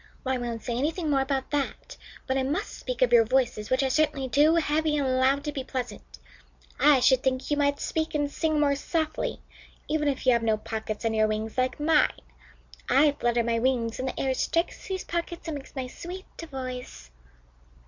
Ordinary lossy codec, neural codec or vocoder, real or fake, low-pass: Opus, 64 kbps; none; real; 7.2 kHz